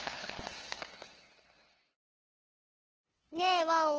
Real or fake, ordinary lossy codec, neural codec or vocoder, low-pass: fake; Opus, 16 kbps; codec, 16 kHz, 2 kbps, FunCodec, trained on LibriTTS, 25 frames a second; 7.2 kHz